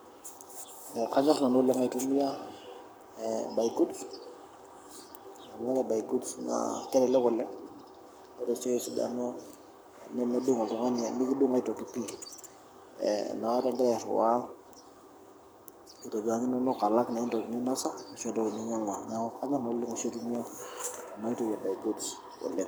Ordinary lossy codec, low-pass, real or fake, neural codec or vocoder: none; none; fake; codec, 44.1 kHz, 7.8 kbps, Pupu-Codec